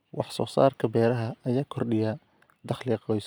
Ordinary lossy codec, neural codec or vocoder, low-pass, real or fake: none; vocoder, 44.1 kHz, 128 mel bands every 256 samples, BigVGAN v2; none; fake